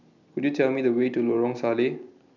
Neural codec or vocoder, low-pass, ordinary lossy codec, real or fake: none; 7.2 kHz; none; real